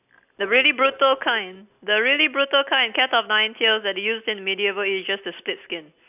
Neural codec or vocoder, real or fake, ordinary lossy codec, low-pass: none; real; none; 3.6 kHz